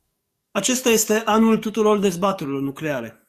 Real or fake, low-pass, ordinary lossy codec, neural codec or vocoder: fake; 14.4 kHz; Opus, 64 kbps; codec, 44.1 kHz, 7.8 kbps, DAC